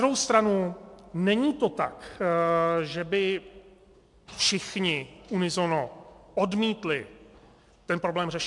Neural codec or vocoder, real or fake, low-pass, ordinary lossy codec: none; real; 10.8 kHz; MP3, 64 kbps